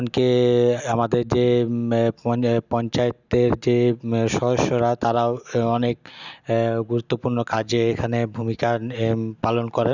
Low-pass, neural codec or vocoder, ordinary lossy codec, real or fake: 7.2 kHz; none; none; real